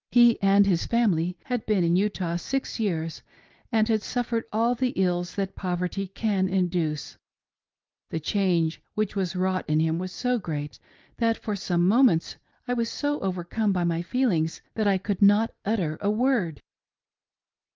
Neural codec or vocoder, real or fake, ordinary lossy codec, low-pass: none; real; Opus, 32 kbps; 7.2 kHz